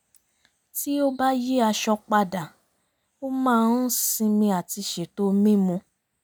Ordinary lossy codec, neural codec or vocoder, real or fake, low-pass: none; none; real; none